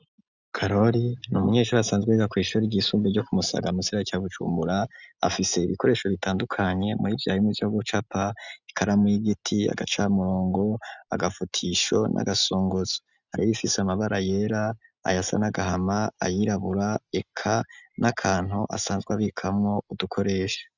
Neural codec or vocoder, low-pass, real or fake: none; 7.2 kHz; real